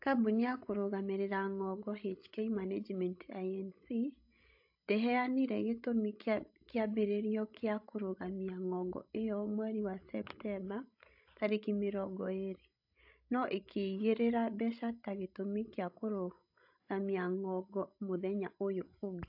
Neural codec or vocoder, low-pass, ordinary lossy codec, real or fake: codec, 16 kHz, 16 kbps, FreqCodec, larger model; 5.4 kHz; AAC, 48 kbps; fake